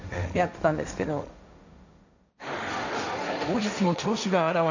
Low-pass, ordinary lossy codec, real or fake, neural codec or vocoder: 7.2 kHz; none; fake; codec, 16 kHz, 1.1 kbps, Voila-Tokenizer